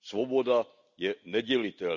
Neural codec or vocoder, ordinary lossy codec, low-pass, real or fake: none; none; 7.2 kHz; real